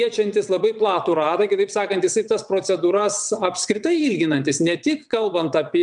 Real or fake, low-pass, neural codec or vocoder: fake; 9.9 kHz; vocoder, 22.05 kHz, 80 mel bands, WaveNeXt